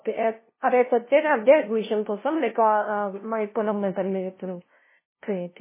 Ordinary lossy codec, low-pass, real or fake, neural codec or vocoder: MP3, 16 kbps; 3.6 kHz; fake; codec, 16 kHz, 0.5 kbps, FunCodec, trained on LibriTTS, 25 frames a second